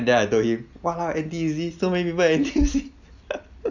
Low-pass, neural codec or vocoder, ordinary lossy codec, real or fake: 7.2 kHz; none; none; real